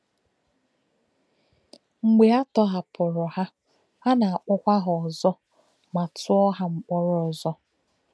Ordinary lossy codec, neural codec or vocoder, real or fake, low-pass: none; none; real; none